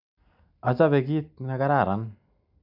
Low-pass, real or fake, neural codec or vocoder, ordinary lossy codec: 5.4 kHz; real; none; none